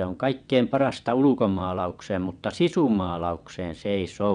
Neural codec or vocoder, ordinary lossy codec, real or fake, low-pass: vocoder, 22.05 kHz, 80 mel bands, WaveNeXt; none; fake; 9.9 kHz